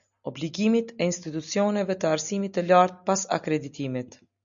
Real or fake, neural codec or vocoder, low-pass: real; none; 7.2 kHz